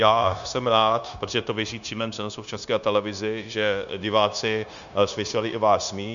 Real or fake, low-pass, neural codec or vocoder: fake; 7.2 kHz; codec, 16 kHz, 0.9 kbps, LongCat-Audio-Codec